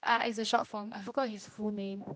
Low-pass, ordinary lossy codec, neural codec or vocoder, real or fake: none; none; codec, 16 kHz, 1 kbps, X-Codec, HuBERT features, trained on general audio; fake